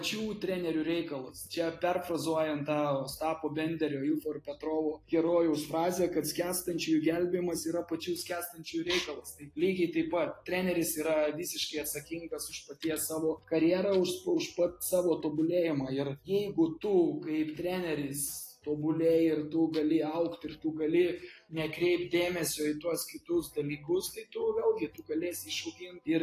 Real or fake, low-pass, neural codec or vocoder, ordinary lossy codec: real; 14.4 kHz; none; AAC, 48 kbps